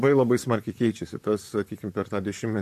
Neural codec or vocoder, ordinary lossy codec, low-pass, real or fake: vocoder, 44.1 kHz, 128 mel bands, Pupu-Vocoder; MP3, 64 kbps; 14.4 kHz; fake